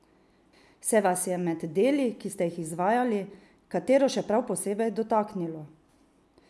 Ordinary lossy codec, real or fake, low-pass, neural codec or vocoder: none; real; none; none